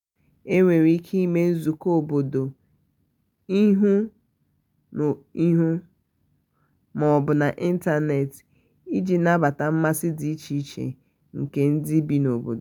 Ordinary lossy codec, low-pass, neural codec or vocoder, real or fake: none; 19.8 kHz; none; real